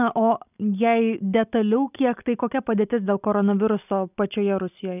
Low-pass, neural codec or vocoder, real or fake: 3.6 kHz; none; real